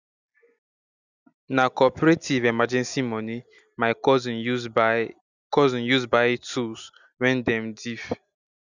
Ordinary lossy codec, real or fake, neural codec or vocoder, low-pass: none; real; none; 7.2 kHz